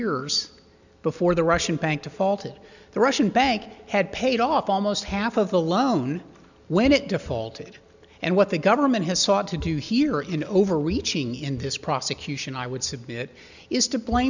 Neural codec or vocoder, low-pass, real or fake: none; 7.2 kHz; real